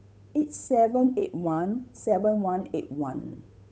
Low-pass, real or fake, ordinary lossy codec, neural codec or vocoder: none; fake; none; codec, 16 kHz, 8 kbps, FunCodec, trained on Chinese and English, 25 frames a second